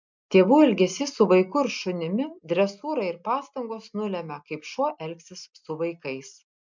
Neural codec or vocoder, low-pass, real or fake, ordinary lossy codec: none; 7.2 kHz; real; MP3, 64 kbps